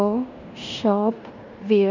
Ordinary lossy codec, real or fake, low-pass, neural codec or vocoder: none; fake; 7.2 kHz; codec, 16 kHz in and 24 kHz out, 0.9 kbps, LongCat-Audio-Codec, fine tuned four codebook decoder